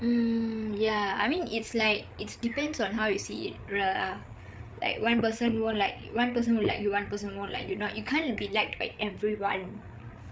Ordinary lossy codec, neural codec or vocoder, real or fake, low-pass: none; codec, 16 kHz, 8 kbps, FreqCodec, larger model; fake; none